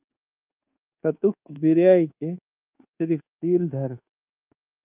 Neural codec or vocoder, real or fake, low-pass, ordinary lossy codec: codec, 24 kHz, 1.2 kbps, DualCodec; fake; 3.6 kHz; Opus, 24 kbps